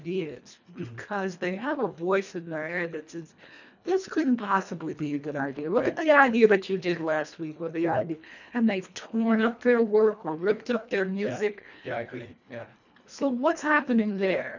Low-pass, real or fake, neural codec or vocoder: 7.2 kHz; fake; codec, 24 kHz, 1.5 kbps, HILCodec